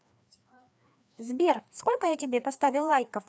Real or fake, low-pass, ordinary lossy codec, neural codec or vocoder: fake; none; none; codec, 16 kHz, 2 kbps, FreqCodec, larger model